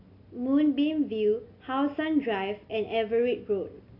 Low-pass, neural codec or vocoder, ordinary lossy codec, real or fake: 5.4 kHz; none; AAC, 32 kbps; real